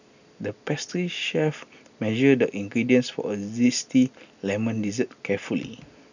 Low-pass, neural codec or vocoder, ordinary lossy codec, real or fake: 7.2 kHz; none; none; real